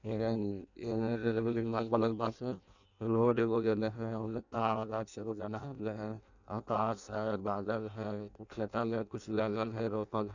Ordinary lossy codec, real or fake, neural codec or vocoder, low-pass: none; fake; codec, 16 kHz in and 24 kHz out, 0.6 kbps, FireRedTTS-2 codec; 7.2 kHz